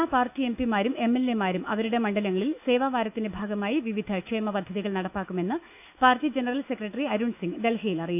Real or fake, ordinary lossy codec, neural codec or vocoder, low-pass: fake; none; autoencoder, 48 kHz, 128 numbers a frame, DAC-VAE, trained on Japanese speech; 3.6 kHz